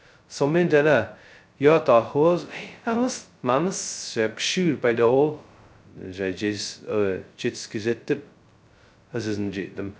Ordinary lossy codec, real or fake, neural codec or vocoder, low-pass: none; fake; codec, 16 kHz, 0.2 kbps, FocalCodec; none